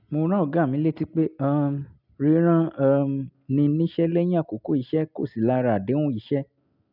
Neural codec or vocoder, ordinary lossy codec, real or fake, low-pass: none; none; real; 5.4 kHz